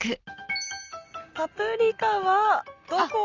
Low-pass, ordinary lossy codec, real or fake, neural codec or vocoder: 7.2 kHz; Opus, 32 kbps; real; none